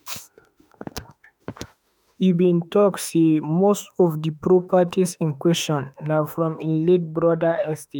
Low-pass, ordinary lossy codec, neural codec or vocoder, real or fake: none; none; autoencoder, 48 kHz, 32 numbers a frame, DAC-VAE, trained on Japanese speech; fake